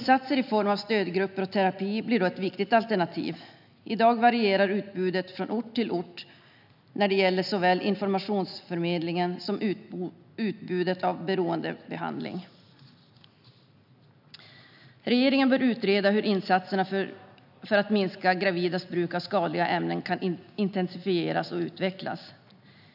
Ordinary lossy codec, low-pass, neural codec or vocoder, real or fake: none; 5.4 kHz; none; real